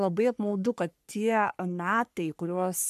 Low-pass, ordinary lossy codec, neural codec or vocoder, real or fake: 14.4 kHz; AAC, 96 kbps; codec, 44.1 kHz, 3.4 kbps, Pupu-Codec; fake